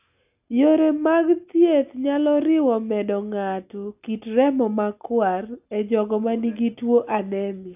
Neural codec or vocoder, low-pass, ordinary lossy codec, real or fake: none; 3.6 kHz; AAC, 32 kbps; real